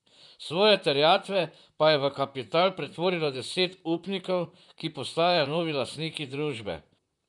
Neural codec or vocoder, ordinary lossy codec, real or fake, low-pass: vocoder, 44.1 kHz, 128 mel bands, Pupu-Vocoder; none; fake; 10.8 kHz